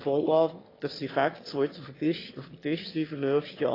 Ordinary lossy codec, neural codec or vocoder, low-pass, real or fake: AAC, 24 kbps; autoencoder, 22.05 kHz, a latent of 192 numbers a frame, VITS, trained on one speaker; 5.4 kHz; fake